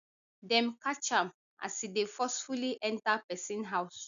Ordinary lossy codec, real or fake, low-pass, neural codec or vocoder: none; real; 7.2 kHz; none